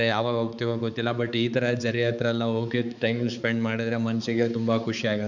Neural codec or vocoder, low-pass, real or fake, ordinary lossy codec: codec, 16 kHz, 4 kbps, X-Codec, HuBERT features, trained on balanced general audio; 7.2 kHz; fake; none